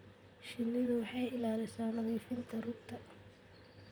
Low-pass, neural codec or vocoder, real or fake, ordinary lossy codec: none; vocoder, 44.1 kHz, 128 mel bands, Pupu-Vocoder; fake; none